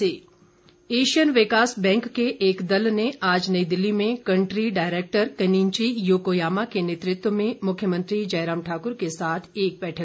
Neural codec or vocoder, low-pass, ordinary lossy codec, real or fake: none; none; none; real